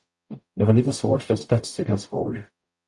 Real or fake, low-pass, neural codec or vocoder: fake; 10.8 kHz; codec, 44.1 kHz, 0.9 kbps, DAC